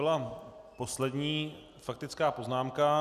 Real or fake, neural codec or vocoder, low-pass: real; none; 14.4 kHz